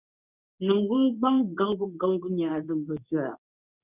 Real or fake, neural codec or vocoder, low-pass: fake; codec, 24 kHz, 0.9 kbps, WavTokenizer, medium speech release version 2; 3.6 kHz